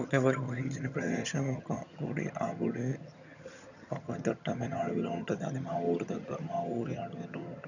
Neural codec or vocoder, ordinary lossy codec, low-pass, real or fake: vocoder, 22.05 kHz, 80 mel bands, HiFi-GAN; none; 7.2 kHz; fake